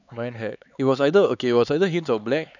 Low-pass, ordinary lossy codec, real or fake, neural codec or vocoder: 7.2 kHz; none; fake; codec, 16 kHz, 4 kbps, X-Codec, HuBERT features, trained on LibriSpeech